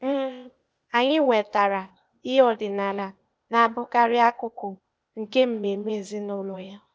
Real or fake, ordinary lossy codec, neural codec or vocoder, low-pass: fake; none; codec, 16 kHz, 0.8 kbps, ZipCodec; none